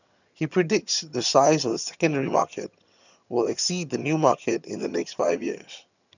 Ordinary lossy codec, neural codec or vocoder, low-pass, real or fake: none; vocoder, 22.05 kHz, 80 mel bands, HiFi-GAN; 7.2 kHz; fake